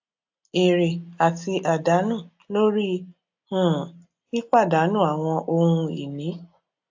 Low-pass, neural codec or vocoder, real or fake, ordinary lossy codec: 7.2 kHz; none; real; AAC, 48 kbps